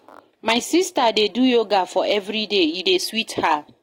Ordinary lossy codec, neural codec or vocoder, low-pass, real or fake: AAC, 48 kbps; none; 19.8 kHz; real